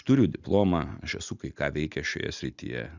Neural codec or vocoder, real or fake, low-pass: none; real; 7.2 kHz